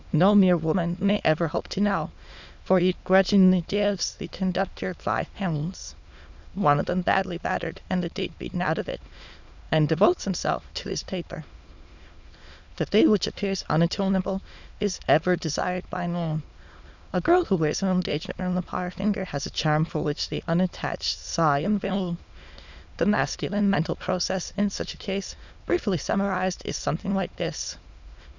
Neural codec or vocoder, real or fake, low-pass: autoencoder, 22.05 kHz, a latent of 192 numbers a frame, VITS, trained on many speakers; fake; 7.2 kHz